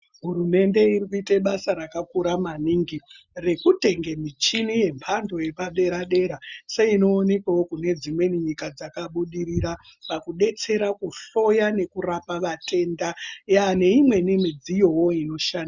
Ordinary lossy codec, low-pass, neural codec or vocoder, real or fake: Opus, 64 kbps; 7.2 kHz; none; real